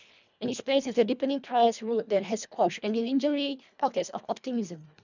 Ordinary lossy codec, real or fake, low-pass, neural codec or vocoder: none; fake; 7.2 kHz; codec, 24 kHz, 1.5 kbps, HILCodec